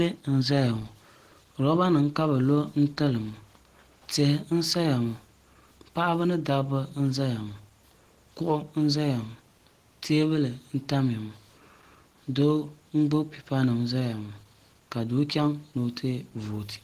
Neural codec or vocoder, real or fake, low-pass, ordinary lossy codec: none; real; 14.4 kHz; Opus, 16 kbps